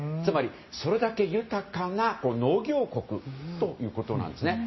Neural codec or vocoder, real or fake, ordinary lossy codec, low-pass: none; real; MP3, 24 kbps; 7.2 kHz